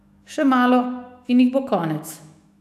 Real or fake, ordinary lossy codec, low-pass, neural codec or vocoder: fake; none; 14.4 kHz; autoencoder, 48 kHz, 128 numbers a frame, DAC-VAE, trained on Japanese speech